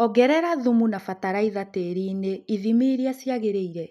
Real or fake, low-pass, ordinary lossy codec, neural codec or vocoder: real; 14.4 kHz; none; none